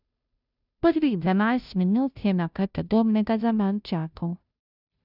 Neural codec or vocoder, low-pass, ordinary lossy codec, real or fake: codec, 16 kHz, 0.5 kbps, FunCodec, trained on Chinese and English, 25 frames a second; 5.4 kHz; none; fake